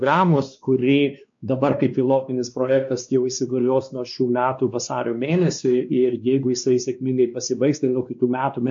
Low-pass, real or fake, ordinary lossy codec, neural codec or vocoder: 7.2 kHz; fake; MP3, 48 kbps; codec, 16 kHz, 2 kbps, X-Codec, WavLM features, trained on Multilingual LibriSpeech